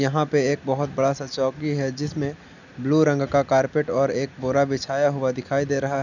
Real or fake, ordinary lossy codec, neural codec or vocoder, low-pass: real; none; none; 7.2 kHz